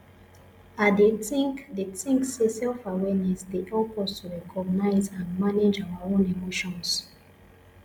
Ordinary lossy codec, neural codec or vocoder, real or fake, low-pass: MP3, 96 kbps; none; real; 19.8 kHz